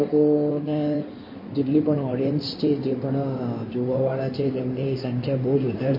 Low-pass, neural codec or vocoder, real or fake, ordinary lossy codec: 5.4 kHz; codec, 16 kHz in and 24 kHz out, 2.2 kbps, FireRedTTS-2 codec; fake; MP3, 24 kbps